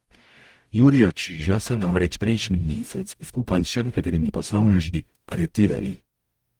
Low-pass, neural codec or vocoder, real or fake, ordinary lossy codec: 19.8 kHz; codec, 44.1 kHz, 0.9 kbps, DAC; fake; Opus, 32 kbps